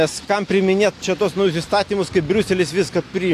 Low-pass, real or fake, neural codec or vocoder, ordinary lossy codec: 14.4 kHz; real; none; AAC, 64 kbps